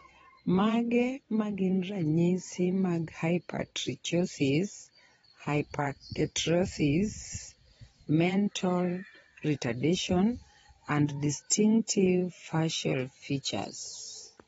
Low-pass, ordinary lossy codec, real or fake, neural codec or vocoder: 9.9 kHz; AAC, 24 kbps; fake; vocoder, 22.05 kHz, 80 mel bands, Vocos